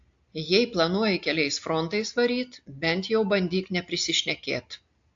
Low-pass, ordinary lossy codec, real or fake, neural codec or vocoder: 7.2 kHz; AAC, 64 kbps; real; none